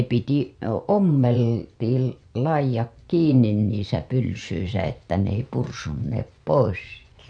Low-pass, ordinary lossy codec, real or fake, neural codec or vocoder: 9.9 kHz; none; fake; vocoder, 24 kHz, 100 mel bands, Vocos